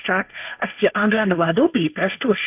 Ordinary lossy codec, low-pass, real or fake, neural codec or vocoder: none; 3.6 kHz; fake; codec, 16 kHz, 1.1 kbps, Voila-Tokenizer